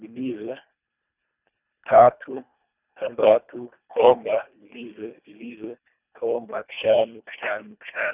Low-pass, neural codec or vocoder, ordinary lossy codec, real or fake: 3.6 kHz; codec, 24 kHz, 1.5 kbps, HILCodec; none; fake